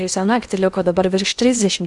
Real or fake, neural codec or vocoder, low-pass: fake; codec, 16 kHz in and 24 kHz out, 0.8 kbps, FocalCodec, streaming, 65536 codes; 10.8 kHz